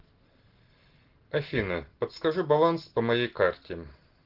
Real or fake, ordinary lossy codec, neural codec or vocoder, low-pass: real; Opus, 16 kbps; none; 5.4 kHz